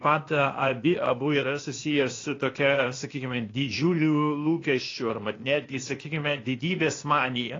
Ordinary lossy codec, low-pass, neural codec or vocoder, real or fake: AAC, 32 kbps; 7.2 kHz; codec, 16 kHz, 0.8 kbps, ZipCodec; fake